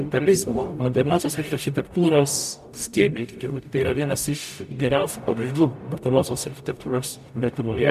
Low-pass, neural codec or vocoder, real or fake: 14.4 kHz; codec, 44.1 kHz, 0.9 kbps, DAC; fake